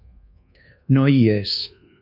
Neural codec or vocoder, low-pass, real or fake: codec, 24 kHz, 1.2 kbps, DualCodec; 5.4 kHz; fake